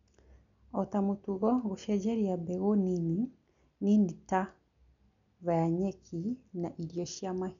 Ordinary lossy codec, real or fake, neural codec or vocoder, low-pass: none; real; none; 7.2 kHz